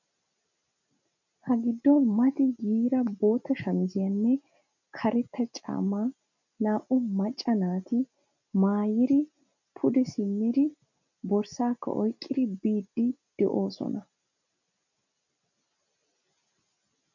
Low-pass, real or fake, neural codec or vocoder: 7.2 kHz; real; none